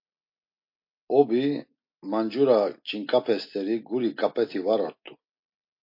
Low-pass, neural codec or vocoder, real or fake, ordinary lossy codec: 5.4 kHz; none; real; MP3, 32 kbps